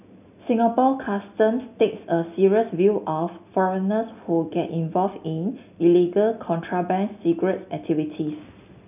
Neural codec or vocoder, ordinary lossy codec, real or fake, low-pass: none; none; real; 3.6 kHz